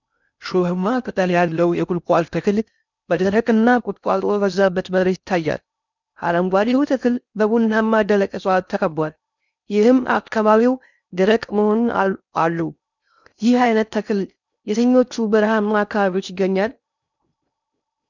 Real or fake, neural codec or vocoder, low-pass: fake; codec, 16 kHz in and 24 kHz out, 0.6 kbps, FocalCodec, streaming, 4096 codes; 7.2 kHz